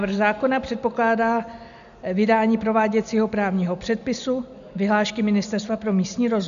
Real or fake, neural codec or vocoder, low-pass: real; none; 7.2 kHz